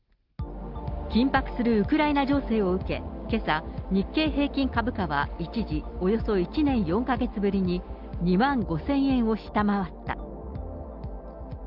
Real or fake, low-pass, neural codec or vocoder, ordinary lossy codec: real; 5.4 kHz; none; Opus, 32 kbps